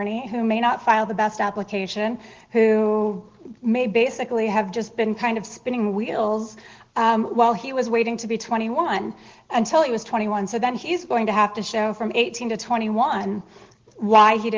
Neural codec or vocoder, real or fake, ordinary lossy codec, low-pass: none; real; Opus, 16 kbps; 7.2 kHz